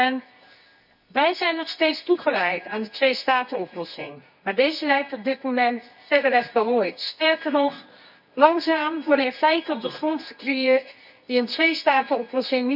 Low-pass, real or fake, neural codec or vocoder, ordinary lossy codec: 5.4 kHz; fake; codec, 24 kHz, 0.9 kbps, WavTokenizer, medium music audio release; none